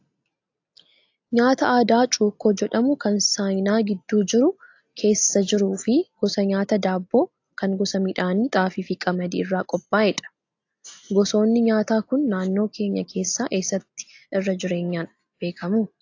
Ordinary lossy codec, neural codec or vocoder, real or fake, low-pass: AAC, 48 kbps; none; real; 7.2 kHz